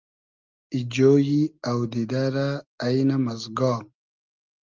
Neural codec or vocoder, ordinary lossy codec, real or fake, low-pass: none; Opus, 32 kbps; real; 7.2 kHz